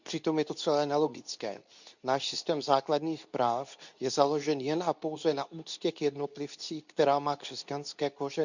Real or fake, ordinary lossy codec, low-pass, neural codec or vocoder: fake; none; 7.2 kHz; codec, 24 kHz, 0.9 kbps, WavTokenizer, medium speech release version 2